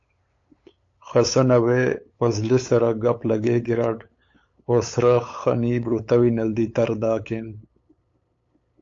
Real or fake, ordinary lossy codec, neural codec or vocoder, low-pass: fake; AAC, 48 kbps; codec, 16 kHz, 8 kbps, FunCodec, trained on LibriTTS, 25 frames a second; 7.2 kHz